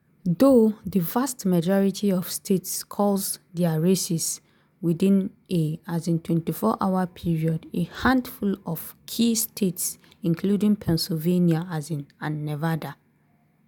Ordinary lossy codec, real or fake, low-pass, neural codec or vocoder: none; real; none; none